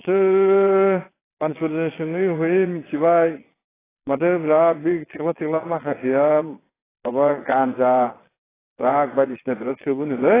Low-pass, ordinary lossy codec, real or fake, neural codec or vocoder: 3.6 kHz; AAC, 16 kbps; fake; vocoder, 22.05 kHz, 80 mel bands, Vocos